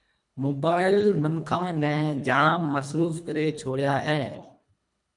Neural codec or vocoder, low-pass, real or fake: codec, 24 kHz, 1.5 kbps, HILCodec; 10.8 kHz; fake